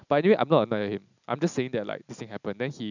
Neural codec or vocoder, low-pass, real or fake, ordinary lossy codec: none; 7.2 kHz; real; none